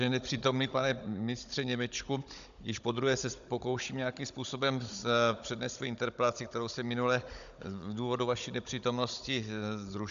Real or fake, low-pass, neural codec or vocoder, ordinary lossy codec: fake; 7.2 kHz; codec, 16 kHz, 16 kbps, FunCodec, trained on Chinese and English, 50 frames a second; AAC, 96 kbps